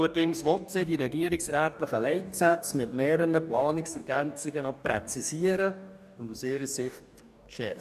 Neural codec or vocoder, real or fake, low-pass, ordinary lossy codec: codec, 44.1 kHz, 2.6 kbps, DAC; fake; 14.4 kHz; none